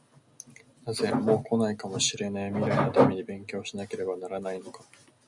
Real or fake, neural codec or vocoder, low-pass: real; none; 10.8 kHz